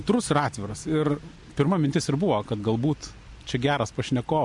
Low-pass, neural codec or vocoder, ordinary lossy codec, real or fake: 10.8 kHz; none; MP3, 64 kbps; real